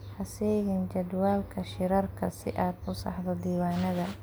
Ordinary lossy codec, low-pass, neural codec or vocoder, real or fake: none; none; none; real